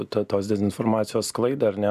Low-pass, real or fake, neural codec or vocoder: 14.4 kHz; real; none